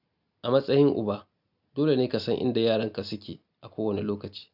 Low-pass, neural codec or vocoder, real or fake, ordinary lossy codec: 5.4 kHz; none; real; none